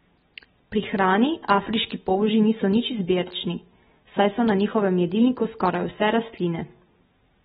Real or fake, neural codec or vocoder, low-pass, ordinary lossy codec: real; none; 19.8 kHz; AAC, 16 kbps